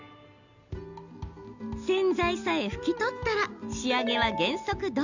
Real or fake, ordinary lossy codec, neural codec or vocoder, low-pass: real; none; none; 7.2 kHz